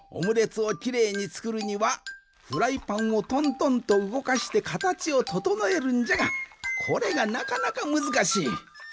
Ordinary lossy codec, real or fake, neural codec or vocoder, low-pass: none; real; none; none